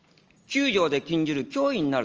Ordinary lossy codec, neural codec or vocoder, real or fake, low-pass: Opus, 24 kbps; none; real; 7.2 kHz